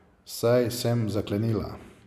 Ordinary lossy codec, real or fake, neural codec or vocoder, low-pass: none; real; none; 14.4 kHz